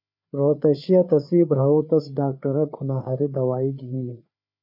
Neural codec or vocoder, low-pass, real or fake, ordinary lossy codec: codec, 16 kHz, 4 kbps, FreqCodec, larger model; 5.4 kHz; fake; MP3, 48 kbps